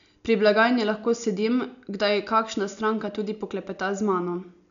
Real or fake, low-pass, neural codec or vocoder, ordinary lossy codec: real; 7.2 kHz; none; none